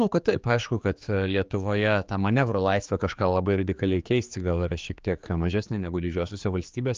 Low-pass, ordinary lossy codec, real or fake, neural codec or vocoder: 7.2 kHz; Opus, 24 kbps; fake; codec, 16 kHz, 4 kbps, X-Codec, HuBERT features, trained on general audio